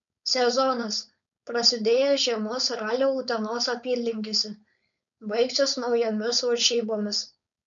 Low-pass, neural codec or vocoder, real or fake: 7.2 kHz; codec, 16 kHz, 4.8 kbps, FACodec; fake